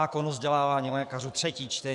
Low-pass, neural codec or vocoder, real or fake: 10.8 kHz; codec, 44.1 kHz, 7.8 kbps, DAC; fake